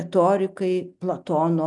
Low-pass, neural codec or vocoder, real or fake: 10.8 kHz; none; real